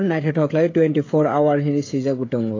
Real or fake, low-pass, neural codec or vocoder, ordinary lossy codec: fake; 7.2 kHz; codec, 16 kHz, 16 kbps, FreqCodec, smaller model; AAC, 32 kbps